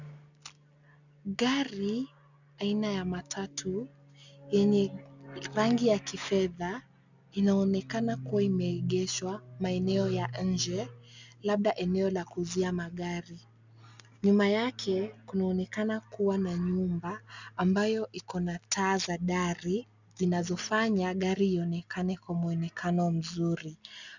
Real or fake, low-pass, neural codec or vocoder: real; 7.2 kHz; none